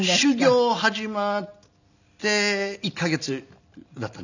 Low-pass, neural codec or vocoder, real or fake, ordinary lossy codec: 7.2 kHz; none; real; none